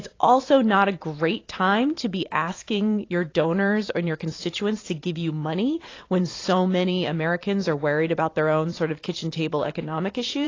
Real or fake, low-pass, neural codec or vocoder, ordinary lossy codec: fake; 7.2 kHz; vocoder, 44.1 kHz, 128 mel bands every 512 samples, BigVGAN v2; AAC, 32 kbps